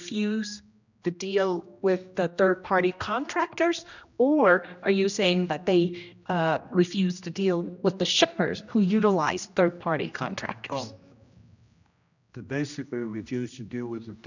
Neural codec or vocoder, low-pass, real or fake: codec, 16 kHz, 1 kbps, X-Codec, HuBERT features, trained on general audio; 7.2 kHz; fake